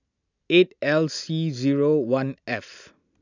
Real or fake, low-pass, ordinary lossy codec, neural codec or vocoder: real; 7.2 kHz; none; none